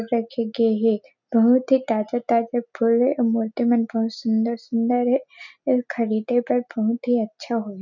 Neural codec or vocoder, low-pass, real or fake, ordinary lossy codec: none; 7.2 kHz; real; none